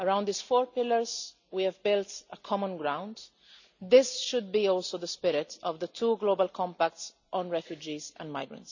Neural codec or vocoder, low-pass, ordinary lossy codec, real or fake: none; 7.2 kHz; none; real